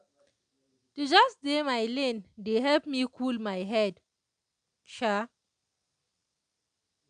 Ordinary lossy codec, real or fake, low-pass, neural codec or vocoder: none; real; 9.9 kHz; none